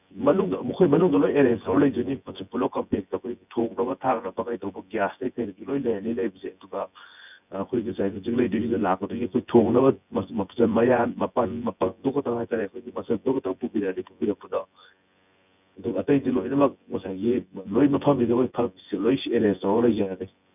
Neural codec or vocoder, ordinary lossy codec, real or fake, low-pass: vocoder, 24 kHz, 100 mel bands, Vocos; none; fake; 3.6 kHz